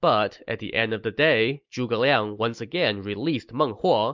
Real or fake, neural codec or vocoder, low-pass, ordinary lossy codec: real; none; 7.2 kHz; MP3, 64 kbps